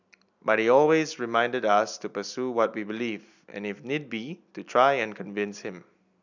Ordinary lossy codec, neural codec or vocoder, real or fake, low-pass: none; none; real; 7.2 kHz